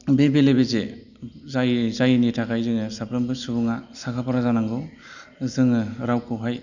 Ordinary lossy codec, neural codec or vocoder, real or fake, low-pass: none; none; real; 7.2 kHz